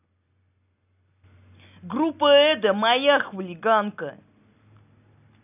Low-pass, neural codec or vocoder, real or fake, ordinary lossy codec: 3.6 kHz; none; real; none